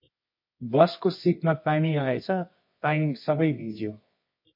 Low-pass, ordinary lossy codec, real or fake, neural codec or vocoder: 5.4 kHz; MP3, 32 kbps; fake; codec, 24 kHz, 0.9 kbps, WavTokenizer, medium music audio release